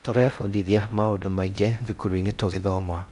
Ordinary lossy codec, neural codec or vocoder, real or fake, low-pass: none; codec, 16 kHz in and 24 kHz out, 0.6 kbps, FocalCodec, streaming, 4096 codes; fake; 10.8 kHz